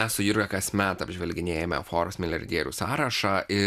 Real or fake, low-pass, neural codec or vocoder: real; 14.4 kHz; none